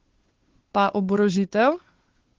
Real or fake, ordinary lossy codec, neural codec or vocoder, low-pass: fake; Opus, 16 kbps; codec, 16 kHz, 2 kbps, FunCodec, trained on Chinese and English, 25 frames a second; 7.2 kHz